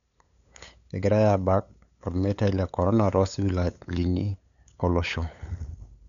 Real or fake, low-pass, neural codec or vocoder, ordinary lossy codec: fake; 7.2 kHz; codec, 16 kHz, 8 kbps, FunCodec, trained on LibriTTS, 25 frames a second; none